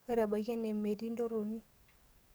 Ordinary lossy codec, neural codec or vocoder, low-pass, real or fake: none; codec, 44.1 kHz, 7.8 kbps, DAC; none; fake